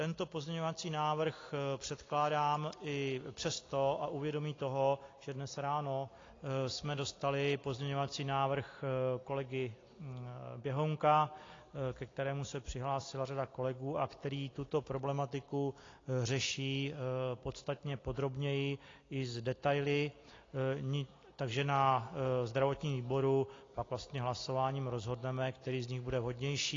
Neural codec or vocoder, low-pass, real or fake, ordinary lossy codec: none; 7.2 kHz; real; AAC, 32 kbps